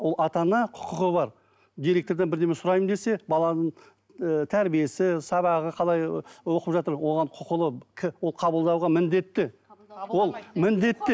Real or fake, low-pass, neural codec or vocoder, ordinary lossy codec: real; none; none; none